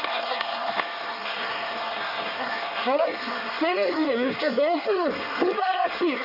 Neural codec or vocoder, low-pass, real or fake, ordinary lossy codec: codec, 24 kHz, 1 kbps, SNAC; 5.4 kHz; fake; none